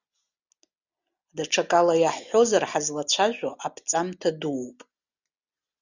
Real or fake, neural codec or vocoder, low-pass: real; none; 7.2 kHz